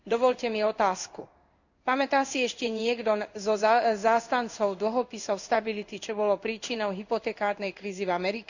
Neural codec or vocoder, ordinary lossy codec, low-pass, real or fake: codec, 16 kHz in and 24 kHz out, 1 kbps, XY-Tokenizer; none; 7.2 kHz; fake